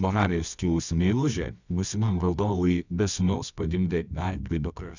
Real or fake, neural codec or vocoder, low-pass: fake; codec, 24 kHz, 0.9 kbps, WavTokenizer, medium music audio release; 7.2 kHz